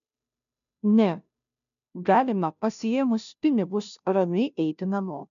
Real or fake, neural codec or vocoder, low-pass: fake; codec, 16 kHz, 0.5 kbps, FunCodec, trained on Chinese and English, 25 frames a second; 7.2 kHz